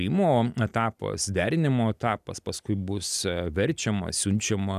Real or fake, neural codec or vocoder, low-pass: real; none; 14.4 kHz